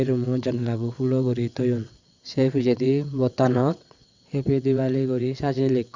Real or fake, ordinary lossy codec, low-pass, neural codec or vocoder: fake; Opus, 64 kbps; 7.2 kHz; vocoder, 22.05 kHz, 80 mel bands, WaveNeXt